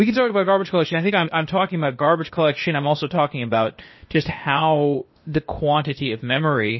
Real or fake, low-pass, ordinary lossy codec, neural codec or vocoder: fake; 7.2 kHz; MP3, 24 kbps; codec, 16 kHz, 0.8 kbps, ZipCodec